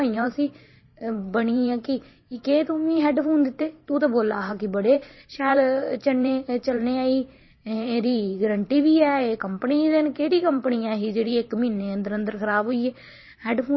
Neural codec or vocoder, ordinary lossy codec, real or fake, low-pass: vocoder, 44.1 kHz, 128 mel bands every 512 samples, BigVGAN v2; MP3, 24 kbps; fake; 7.2 kHz